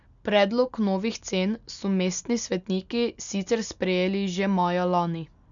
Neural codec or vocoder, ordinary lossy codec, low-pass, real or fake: none; none; 7.2 kHz; real